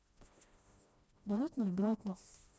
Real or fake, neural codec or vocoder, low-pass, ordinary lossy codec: fake; codec, 16 kHz, 1 kbps, FreqCodec, smaller model; none; none